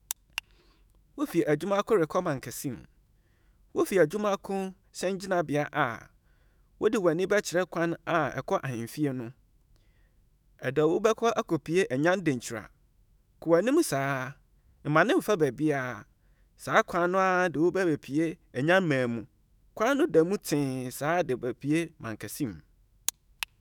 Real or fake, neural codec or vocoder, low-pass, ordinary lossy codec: fake; autoencoder, 48 kHz, 128 numbers a frame, DAC-VAE, trained on Japanese speech; none; none